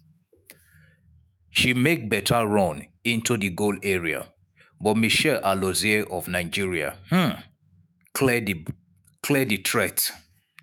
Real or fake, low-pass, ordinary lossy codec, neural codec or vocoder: fake; none; none; autoencoder, 48 kHz, 128 numbers a frame, DAC-VAE, trained on Japanese speech